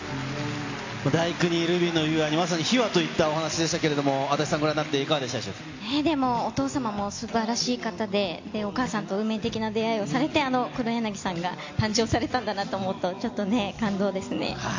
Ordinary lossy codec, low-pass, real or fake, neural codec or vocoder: AAC, 48 kbps; 7.2 kHz; real; none